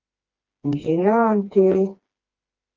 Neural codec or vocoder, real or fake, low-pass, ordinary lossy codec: codec, 16 kHz, 2 kbps, FreqCodec, smaller model; fake; 7.2 kHz; Opus, 24 kbps